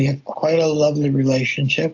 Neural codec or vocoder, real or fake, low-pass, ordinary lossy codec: none; real; 7.2 kHz; AAC, 48 kbps